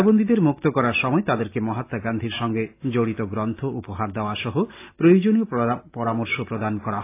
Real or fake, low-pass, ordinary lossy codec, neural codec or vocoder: real; 3.6 kHz; AAC, 24 kbps; none